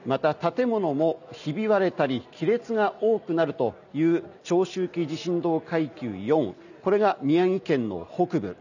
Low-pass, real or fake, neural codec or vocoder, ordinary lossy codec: 7.2 kHz; real; none; none